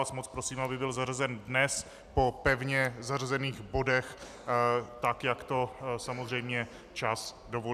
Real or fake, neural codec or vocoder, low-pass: real; none; 14.4 kHz